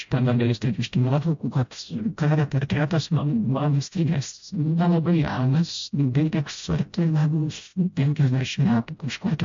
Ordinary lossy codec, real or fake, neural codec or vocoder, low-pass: MP3, 48 kbps; fake; codec, 16 kHz, 0.5 kbps, FreqCodec, smaller model; 7.2 kHz